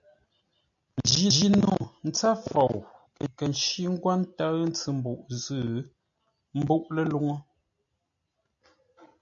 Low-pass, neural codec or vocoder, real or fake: 7.2 kHz; none; real